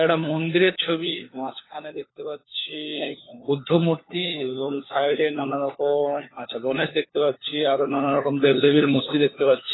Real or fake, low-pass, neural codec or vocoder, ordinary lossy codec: fake; 7.2 kHz; codec, 16 kHz, 4 kbps, FunCodec, trained on LibriTTS, 50 frames a second; AAC, 16 kbps